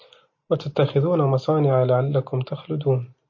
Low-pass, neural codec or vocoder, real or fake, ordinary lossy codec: 7.2 kHz; none; real; MP3, 32 kbps